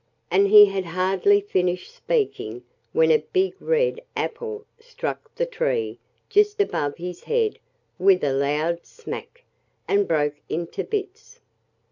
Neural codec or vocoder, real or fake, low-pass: none; real; 7.2 kHz